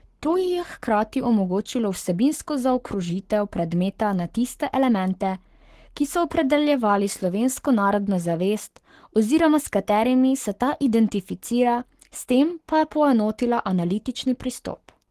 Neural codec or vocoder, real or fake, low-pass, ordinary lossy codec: codec, 44.1 kHz, 7.8 kbps, Pupu-Codec; fake; 14.4 kHz; Opus, 16 kbps